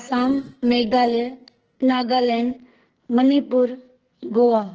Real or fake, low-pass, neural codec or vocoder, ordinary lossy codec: fake; 7.2 kHz; codec, 44.1 kHz, 2.6 kbps, SNAC; Opus, 16 kbps